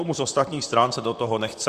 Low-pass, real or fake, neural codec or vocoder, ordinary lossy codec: 14.4 kHz; fake; vocoder, 44.1 kHz, 128 mel bands, Pupu-Vocoder; Opus, 64 kbps